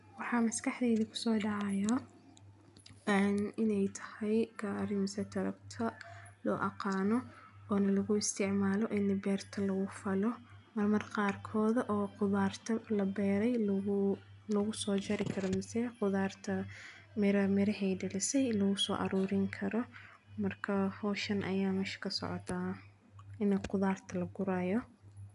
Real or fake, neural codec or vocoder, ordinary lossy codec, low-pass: real; none; none; 10.8 kHz